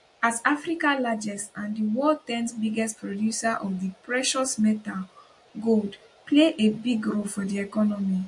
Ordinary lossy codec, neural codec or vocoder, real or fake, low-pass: MP3, 48 kbps; none; real; 10.8 kHz